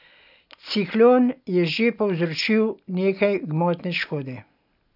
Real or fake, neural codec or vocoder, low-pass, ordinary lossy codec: real; none; 5.4 kHz; none